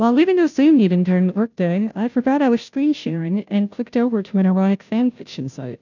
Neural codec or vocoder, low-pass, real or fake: codec, 16 kHz, 0.5 kbps, FunCodec, trained on Chinese and English, 25 frames a second; 7.2 kHz; fake